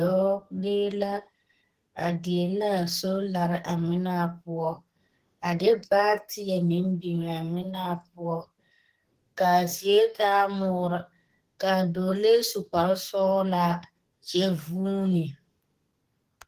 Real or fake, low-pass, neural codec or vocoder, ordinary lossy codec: fake; 14.4 kHz; codec, 32 kHz, 1.9 kbps, SNAC; Opus, 16 kbps